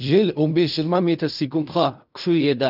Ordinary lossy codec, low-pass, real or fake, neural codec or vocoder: none; 5.4 kHz; fake; codec, 16 kHz in and 24 kHz out, 0.4 kbps, LongCat-Audio-Codec, fine tuned four codebook decoder